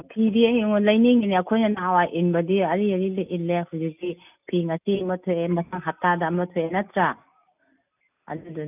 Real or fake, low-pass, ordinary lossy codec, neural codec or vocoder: real; 3.6 kHz; none; none